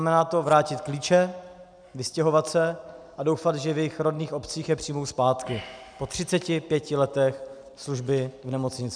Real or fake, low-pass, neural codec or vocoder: real; 9.9 kHz; none